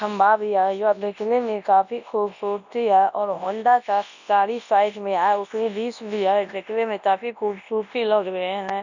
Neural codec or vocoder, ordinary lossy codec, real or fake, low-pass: codec, 24 kHz, 0.9 kbps, WavTokenizer, large speech release; none; fake; 7.2 kHz